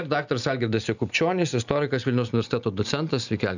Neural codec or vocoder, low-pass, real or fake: none; 7.2 kHz; real